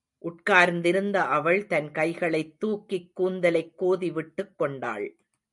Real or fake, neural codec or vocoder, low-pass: real; none; 10.8 kHz